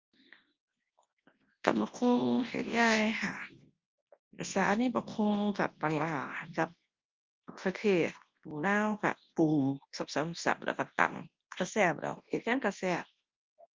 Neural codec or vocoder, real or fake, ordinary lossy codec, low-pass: codec, 24 kHz, 0.9 kbps, WavTokenizer, large speech release; fake; Opus, 32 kbps; 7.2 kHz